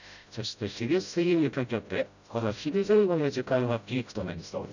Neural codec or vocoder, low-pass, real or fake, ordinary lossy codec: codec, 16 kHz, 0.5 kbps, FreqCodec, smaller model; 7.2 kHz; fake; none